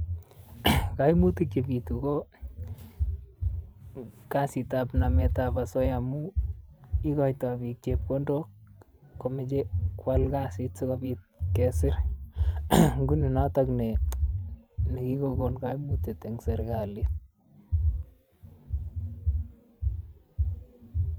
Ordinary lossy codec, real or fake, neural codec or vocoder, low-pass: none; fake; vocoder, 44.1 kHz, 128 mel bands every 512 samples, BigVGAN v2; none